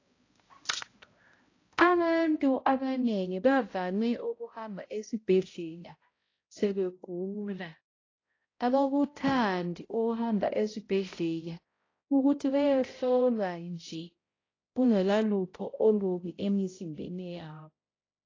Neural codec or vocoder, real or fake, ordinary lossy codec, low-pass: codec, 16 kHz, 0.5 kbps, X-Codec, HuBERT features, trained on balanced general audio; fake; AAC, 32 kbps; 7.2 kHz